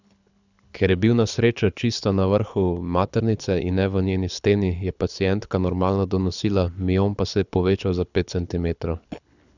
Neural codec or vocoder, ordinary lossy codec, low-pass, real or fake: codec, 24 kHz, 6 kbps, HILCodec; none; 7.2 kHz; fake